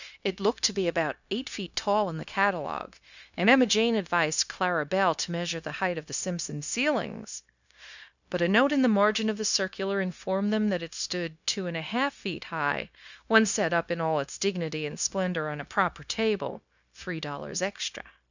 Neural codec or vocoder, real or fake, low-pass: codec, 16 kHz, 0.9 kbps, LongCat-Audio-Codec; fake; 7.2 kHz